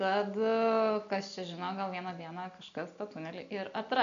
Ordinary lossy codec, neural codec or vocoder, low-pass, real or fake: MP3, 64 kbps; none; 7.2 kHz; real